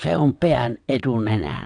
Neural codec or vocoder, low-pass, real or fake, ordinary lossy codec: vocoder, 22.05 kHz, 80 mel bands, WaveNeXt; 9.9 kHz; fake; AAC, 64 kbps